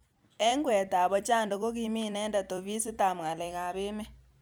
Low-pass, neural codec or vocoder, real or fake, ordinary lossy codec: none; vocoder, 44.1 kHz, 128 mel bands every 256 samples, BigVGAN v2; fake; none